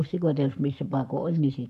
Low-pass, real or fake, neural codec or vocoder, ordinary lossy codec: 14.4 kHz; fake; codec, 44.1 kHz, 7.8 kbps, Pupu-Codec; none